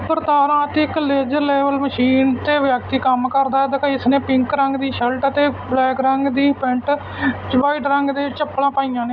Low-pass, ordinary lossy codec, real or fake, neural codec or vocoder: 7.2 kHz; none; real; none